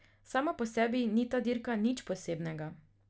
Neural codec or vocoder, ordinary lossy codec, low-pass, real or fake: none; none; none; real